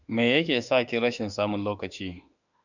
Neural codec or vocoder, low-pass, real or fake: autoencoder, 48 kHz, 32 numbers a frame, DAC-VAE, trained on Japanese speech; 7.2 kHz; fake